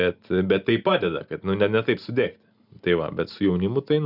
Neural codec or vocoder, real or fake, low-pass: none; real; 5.4 kHz